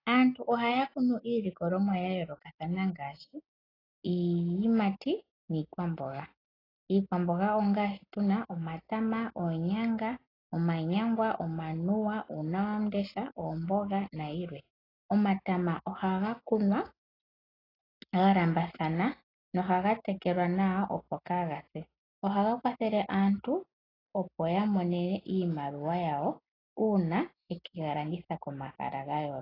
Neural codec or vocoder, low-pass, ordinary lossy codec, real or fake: none; 5.4 kHz; AAC, 24 kbps; real